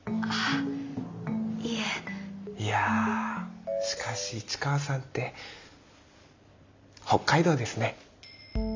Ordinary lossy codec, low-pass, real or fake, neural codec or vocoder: MP3, 64 kbps; 7.2 kHz; real; none